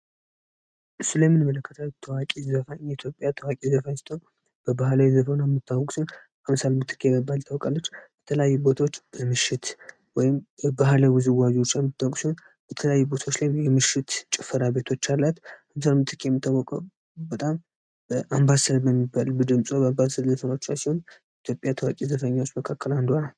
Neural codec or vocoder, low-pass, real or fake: none; 9.9 kHz; real